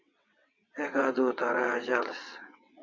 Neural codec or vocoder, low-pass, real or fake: vocoder, 22.05 kHz, 80 mel bands, WaveNeXt; 7.2 kHz; fake